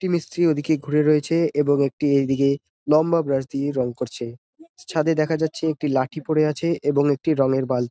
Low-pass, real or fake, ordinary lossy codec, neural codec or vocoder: none; real; none; none